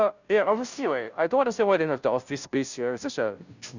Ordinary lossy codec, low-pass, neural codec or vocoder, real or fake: none; 7.2 kHz; codec, 16 kHz, 0.5 kbps, FunCodec, trained on Chinese and English, 25 frames a second; fake